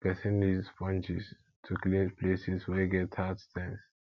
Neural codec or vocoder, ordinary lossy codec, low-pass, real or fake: none; none; 7.2 kHz; real